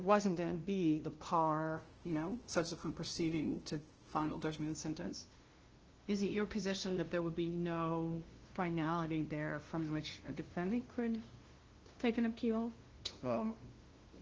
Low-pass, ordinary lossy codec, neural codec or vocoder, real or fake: 7.2 kHz; Opus, 16 kbps; codec, 16 kHz, 0.5 kbps, FunCodec, trained on LibriTTS, 25 frames a second; fake